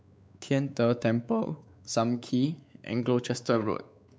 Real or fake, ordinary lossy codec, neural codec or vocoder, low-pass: fake; none; codec, 16 kHz, 4 kbps, X-Codec, WavLM features, trained on Multilingual LibriSpeech; none